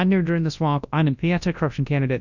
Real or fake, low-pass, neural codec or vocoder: fake; 7.2 kHz; codec, 24 kHz, 0.9 kbps, WavTokenizer, large speech release